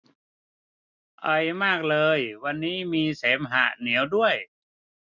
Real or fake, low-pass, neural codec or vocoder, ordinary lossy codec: real; 7.2 kHz; none; none